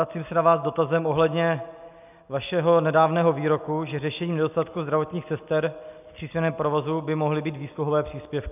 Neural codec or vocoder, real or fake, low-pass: none; real; 3.6 kHz